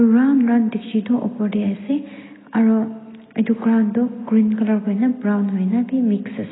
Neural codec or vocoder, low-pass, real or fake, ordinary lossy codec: none; 7.2 kHz; real; AAC, 16 kbps